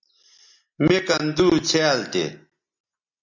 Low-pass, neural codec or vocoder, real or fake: 7.2 kHz; none; real